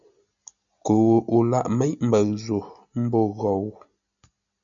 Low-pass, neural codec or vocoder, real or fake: 7.2 kHz; none; real